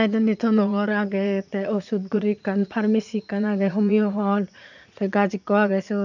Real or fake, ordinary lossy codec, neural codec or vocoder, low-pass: fake; none; vocoder, 44.1 kHz, 80 mel bands, Vocos; 7.2 kHz